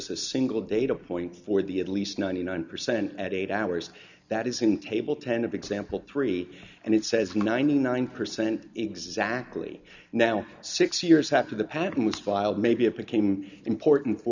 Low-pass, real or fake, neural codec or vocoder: 7.2 kHz; real; none